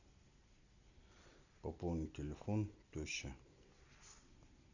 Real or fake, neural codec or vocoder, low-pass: real; none; 7.2 kHz